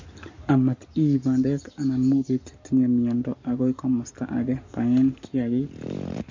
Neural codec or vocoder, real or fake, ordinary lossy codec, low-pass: codec, 44.1 kHz, 7.8 kbps, Pupu-Codec; fake; MP3, 64 kbps; 7.2 kHz